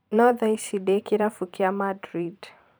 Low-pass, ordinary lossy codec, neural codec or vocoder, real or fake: none; none; vocoder, 44.1 kHz, 128 mel bands every 512 samples, BigVGAN v2; fake